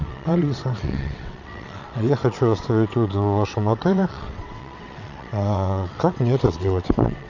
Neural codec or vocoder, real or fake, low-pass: vocoder, 22.05 kHz, 80 mel bands, WaveNeXt; fake; 7.2 kHz